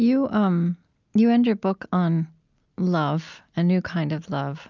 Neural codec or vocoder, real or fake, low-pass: none; real; 7.2 kHz